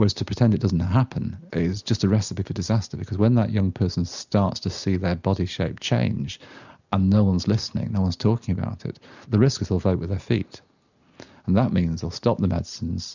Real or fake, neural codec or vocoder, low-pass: real; none; 7.2 kHz